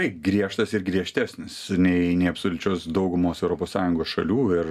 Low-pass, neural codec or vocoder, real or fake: 14.4 kHz; none; real